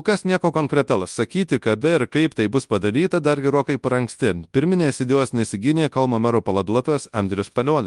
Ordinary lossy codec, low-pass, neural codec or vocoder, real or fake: Opus, 32 kbps; 10.8 kHz; codec, 24 kHz, 0.9 kbps, WavTokenizer, large speech release; fake